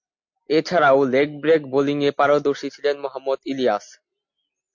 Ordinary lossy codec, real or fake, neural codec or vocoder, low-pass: MP3, 48 kbps; real; none; 7.2 kHz